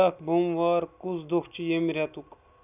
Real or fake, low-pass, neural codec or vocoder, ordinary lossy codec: real; 3.6 kHz; none; none